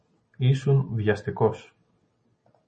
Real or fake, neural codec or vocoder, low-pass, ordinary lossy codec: real; none; 10.8 kHz; MP3, 32 kbps